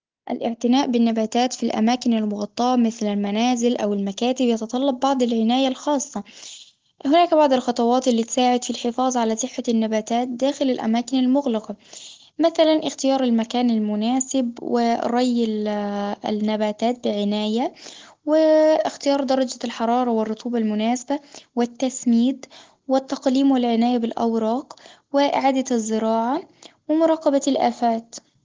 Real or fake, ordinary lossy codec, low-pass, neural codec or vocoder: real; Opus, 16 kbps; 7.2 kHz; none